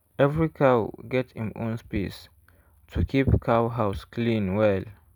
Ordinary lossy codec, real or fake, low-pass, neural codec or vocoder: none; real; 19.8 kHz; none